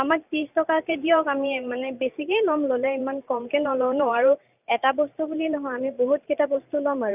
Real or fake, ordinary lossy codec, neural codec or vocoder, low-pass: fake; none; vocoder, 44.1 kHz, 128 mel bands every 512 samples, BigVGAN v2; 3.6 kHz